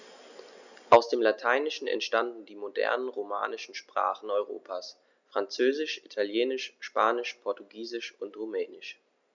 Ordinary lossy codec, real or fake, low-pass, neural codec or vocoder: none; real; 7.2 kHz; none